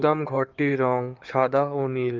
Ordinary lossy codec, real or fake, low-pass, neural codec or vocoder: Opus, 24 kbps; fake; 7.2 kHz; codec, 16 kHz in and 24 kHz out, 2.2 kbps, FireRedTTS-2 codec